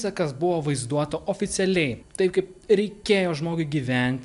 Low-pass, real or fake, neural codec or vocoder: 10.8 kHz; real; none